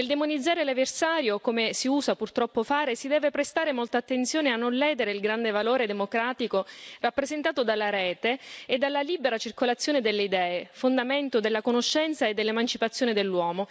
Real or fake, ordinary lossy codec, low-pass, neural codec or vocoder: real; none; none; none